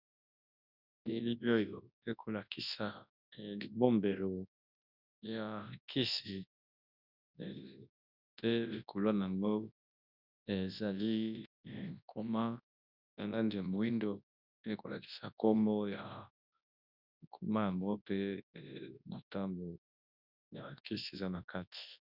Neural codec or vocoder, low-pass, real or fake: codec, 24 kHz, 0.9 kbps, WavTokenizer, large speech release; 5.4 kHz; fake